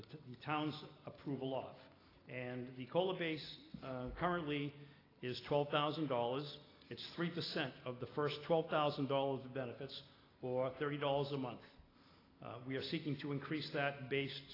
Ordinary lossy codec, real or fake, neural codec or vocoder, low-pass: AAC, 24 kbps; real; none; 5.4 kHz